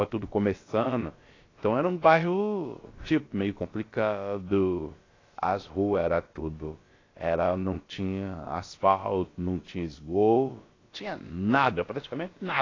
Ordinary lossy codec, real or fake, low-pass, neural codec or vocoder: AAC, 32 kbps; fake; 7.2 kHz; codec, 16 kHz, about 1 kbps, DyCAST, with the encoder's durations